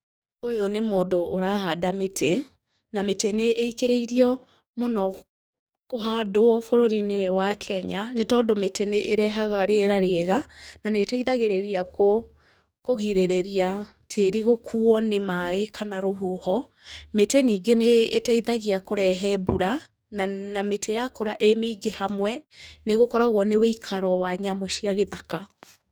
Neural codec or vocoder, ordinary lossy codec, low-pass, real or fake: codec, 44.1 kHz, 2.6 kbps, DAC; none; none; fake